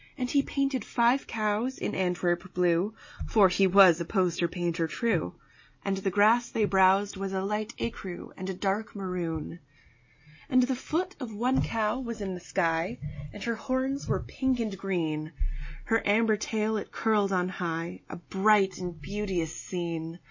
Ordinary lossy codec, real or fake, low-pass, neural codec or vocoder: MP3, 32 kbps; real; 7.2 kHz; none